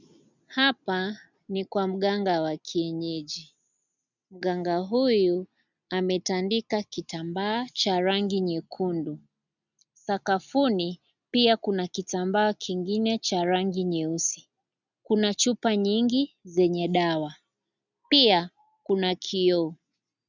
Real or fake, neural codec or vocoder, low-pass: real; none; 7.2 kHz